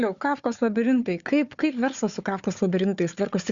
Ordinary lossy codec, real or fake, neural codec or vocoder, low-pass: Opus, 64 kbps; fake; codec, 16 kHz, 4 kbps, FunCodec, trained on Chinese and English, 50 frames a second; 7.2 kHz